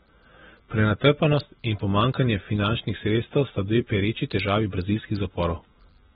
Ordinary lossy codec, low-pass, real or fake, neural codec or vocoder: AAC, 16 kbps; 9.9 kHz; real; none